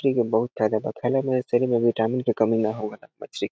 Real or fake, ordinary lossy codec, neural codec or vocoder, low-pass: real; none; none; none